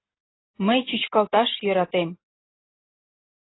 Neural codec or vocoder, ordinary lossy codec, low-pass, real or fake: none; AAC, 16 kbps; 7.2 kHz; real